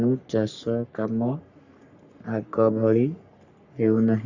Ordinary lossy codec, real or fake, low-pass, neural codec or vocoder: Opus, 64 kbps; fake; 7.2 kHz; codec, 44.1 kHz, 3.4 kbps, Pupu-Codec